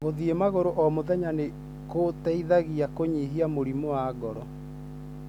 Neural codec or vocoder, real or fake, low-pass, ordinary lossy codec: none; real; 19.8 kHz; none